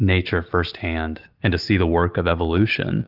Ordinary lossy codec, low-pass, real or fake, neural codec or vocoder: Opus, 32 kbps; 5.4 kHz; real; none